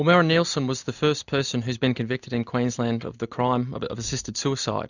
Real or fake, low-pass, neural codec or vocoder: real; 7.2 kHz; none